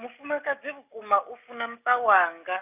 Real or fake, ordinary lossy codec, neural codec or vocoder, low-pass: real; none; none; 3.6 kHz